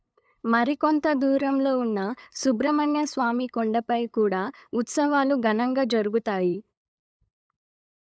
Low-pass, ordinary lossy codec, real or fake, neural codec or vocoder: none; none; fake; codec, 16 kHz, 8 kbps, FunCodec, trained on LibriTTS, 25 frames a second